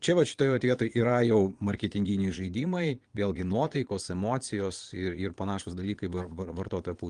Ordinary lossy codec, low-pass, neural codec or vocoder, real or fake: Opus, 24 kbps; 9.9 kHz; vocoder, 22.05 kHz, 80 mel bands, Vocos; fake